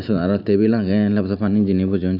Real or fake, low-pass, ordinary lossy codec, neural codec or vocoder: real; 5.4 kHz; none; none